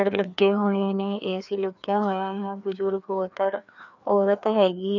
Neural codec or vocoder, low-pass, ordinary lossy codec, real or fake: codec, 16 kHz, 2 kbps, FreqCodec, larger model; 7.2 kHz; none; fake